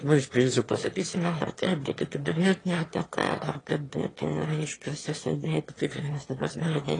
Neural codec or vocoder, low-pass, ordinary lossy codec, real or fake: autoencoder, 22.05 kHz, a latent of 192 numbers a frame, VITS, trained on one speaker; 9.9 kHz; AAC, 32 kbps; fake